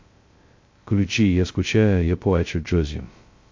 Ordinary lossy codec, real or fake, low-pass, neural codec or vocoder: MP3, 48 kbps; fake; 7.2 kHz; codec, 16 kHz, 0.2 kbps, FocalCodec